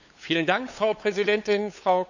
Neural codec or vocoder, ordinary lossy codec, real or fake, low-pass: codec, 16 kHz, 8 kbps, FunCodec, trained on LibriTTS, 25 frames a second; none; fake; 7.2 kHz